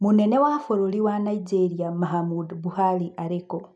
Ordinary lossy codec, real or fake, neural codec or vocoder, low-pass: none; real; none; none